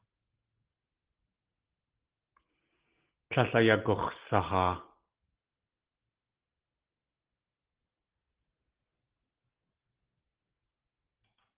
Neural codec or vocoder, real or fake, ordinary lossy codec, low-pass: none; real; Opus, 16 kbps; 3.6 kHz